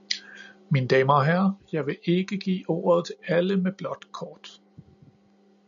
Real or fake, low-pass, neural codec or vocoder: real; 7.2 kHz; none